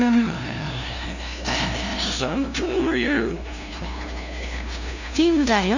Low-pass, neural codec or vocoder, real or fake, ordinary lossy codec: 7.2 kHz; codec, 16 kHz, 0.5 kbps, FunCodec, trained on LibriTTS, 25 frames a second; fake; none